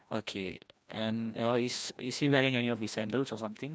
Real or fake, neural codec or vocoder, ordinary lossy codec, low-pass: fake; codec, 16 kHz, 1 kbps, FreqCodec, larger model; none; none